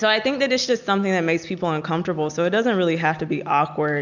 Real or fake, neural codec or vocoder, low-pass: real; none; 7.2 kHz